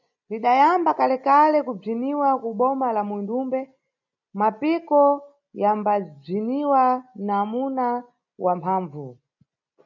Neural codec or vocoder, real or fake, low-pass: none; real; 7.2 kHz